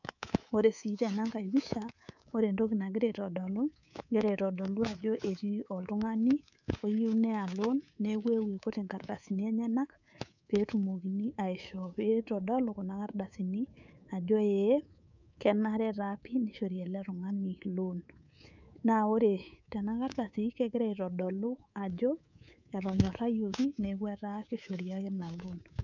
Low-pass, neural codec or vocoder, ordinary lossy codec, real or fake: 7.2 kHz; codec, 24 kHz, 3.1 kbps, DualCodec; none; fake